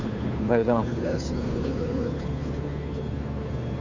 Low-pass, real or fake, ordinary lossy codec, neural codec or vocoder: 7.2 kHz; fake; none; codec, 16 kHz, 2 kbps, FunCodec, trained on Chinese and English, 25 frames a second